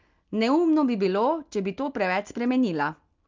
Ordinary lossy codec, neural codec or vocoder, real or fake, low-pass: Opus, 32 kbps; none; real; 7.2 kHz